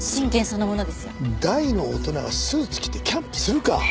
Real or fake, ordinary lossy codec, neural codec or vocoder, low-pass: real; none; none; none